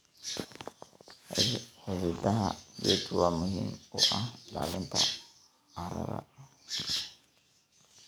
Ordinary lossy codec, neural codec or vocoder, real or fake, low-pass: none; codec, 44.1 kHz, 7.8 kbps, DAC; fake; none